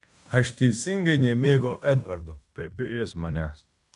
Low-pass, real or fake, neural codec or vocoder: 10.8 kHz; fake; codec, 16 kHz in and 24 kHz out, 0.9 kbps, LongCat-Audio-Codec, fine tuned four codebook decoder